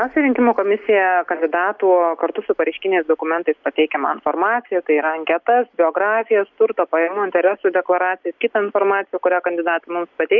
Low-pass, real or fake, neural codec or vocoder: 7.2 kHz; real; none